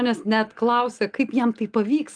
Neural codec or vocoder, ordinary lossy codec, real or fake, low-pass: none; Opus, 32 kbps; real; 9.9 kHz